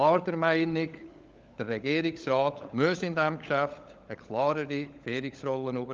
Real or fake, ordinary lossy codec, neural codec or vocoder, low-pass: fake; Opus, 32 kbps; codec, 16 kHz, 16 kbps, FunCodec, trained on LibriTTS, 50 frames a second; 7.2 kHz